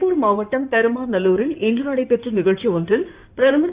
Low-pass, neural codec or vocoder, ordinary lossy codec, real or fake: 3.6 kHz; codec, 16 kHz in and 24 kHz out, 2.2 kbps, FireRedTTS-2 codec; Opus, 64 kbps; fake